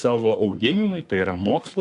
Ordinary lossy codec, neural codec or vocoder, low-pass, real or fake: AAC, 64 kbps; codec, 24 kHz, 1 kbps, SNAC; 10.8 kHz; fake